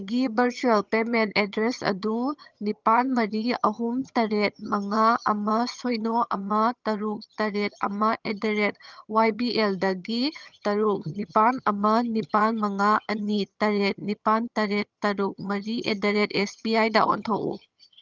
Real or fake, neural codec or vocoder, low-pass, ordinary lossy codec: fake; vocoder, 22.05 kHz, 80 mel bands, HiFi-GAN; 7.2 kHz; Opus, 24 kbps